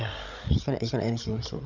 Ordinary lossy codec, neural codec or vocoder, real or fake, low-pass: none; codec, 16 kHz, 16 kbps, FreqCodec, larger model; fake; 7.2 kHz